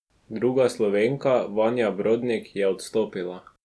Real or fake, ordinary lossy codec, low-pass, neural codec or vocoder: real; none; none; none